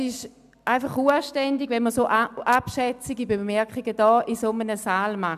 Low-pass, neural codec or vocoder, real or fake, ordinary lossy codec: 14.4 kHz; none; real; none